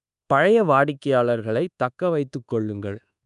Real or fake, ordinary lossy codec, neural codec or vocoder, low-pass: fake; none; codec, 24 kHz, 1.2 kbps, DualCodec; 10.8 kHz